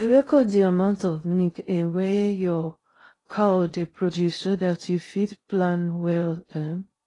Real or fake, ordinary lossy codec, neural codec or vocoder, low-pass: fake; AAC, 32 kbps; codec, 16 kHz in and 24 kHz out, 0.6 kbps, FocalCodec, streaming, 2048 codes; 10.8 kHz